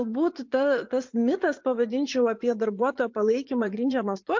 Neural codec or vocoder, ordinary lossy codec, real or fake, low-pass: vocoder, 44.1 kHz, 80 mel bands, Vocos; MP3, 48 kbps; fake; 7.2 kHz